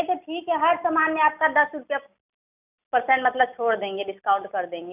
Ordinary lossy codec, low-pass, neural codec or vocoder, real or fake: none; 3.6 kHz; none; real